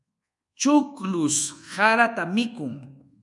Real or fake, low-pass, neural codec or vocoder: fake; 10.8 kHz; codec, 24 kHz, 1.2 kbps, DualCodec